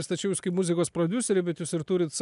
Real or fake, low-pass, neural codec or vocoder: real; 10.8 kHz; none